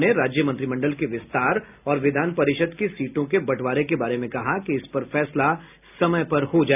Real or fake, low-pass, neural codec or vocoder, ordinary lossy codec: real; 3.6 kHz; none; none